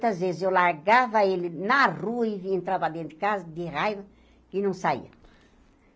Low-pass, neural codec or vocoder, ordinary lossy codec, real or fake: none; none; none; real